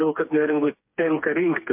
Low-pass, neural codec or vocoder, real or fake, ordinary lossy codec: 3.6 kHz; codec, 16 kHz, 4 kbps, FreqCodec, smaller model; fake; MP3, 32 kbps